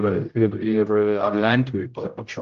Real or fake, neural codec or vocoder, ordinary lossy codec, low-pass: fake; codec, 16 kHz, 0.5 kbps, X-Codec, HuBERT features, trained on general audio; Opus, 32 kbps; 7.2 kHz